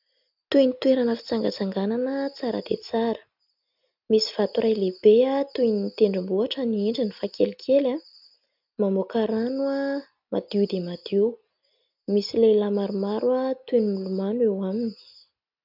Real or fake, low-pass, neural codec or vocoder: real; 5.4 kHz; none